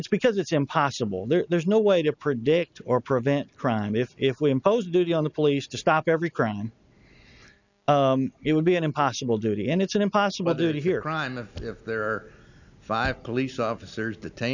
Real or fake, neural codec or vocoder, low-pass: real; none; 7.2 kHz